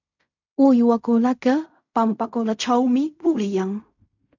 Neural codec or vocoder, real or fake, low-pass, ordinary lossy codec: codec, 16 kHz in and 24 kHz out, 0.4 kbps, LongCat-Audio-Codec, fine tuned four codebook decoder; fake; 7.2 kHz; MP3, 64 kbps